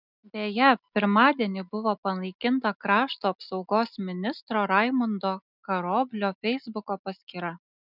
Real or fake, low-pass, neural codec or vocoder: real; 5.4 kHz; none